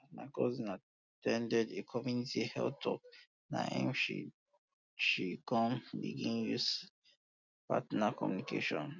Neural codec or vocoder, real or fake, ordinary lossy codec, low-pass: none; real; none; 7.2 kHz